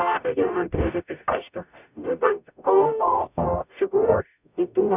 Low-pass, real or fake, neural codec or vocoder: 3.6 kHz; fake; codec, 44.1 kHz, 0.9 kbps, DAC